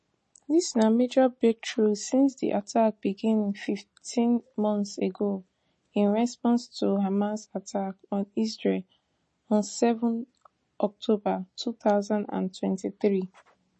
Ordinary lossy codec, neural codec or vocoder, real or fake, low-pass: MP3, 32 kbps; none; real; 10.8 kHz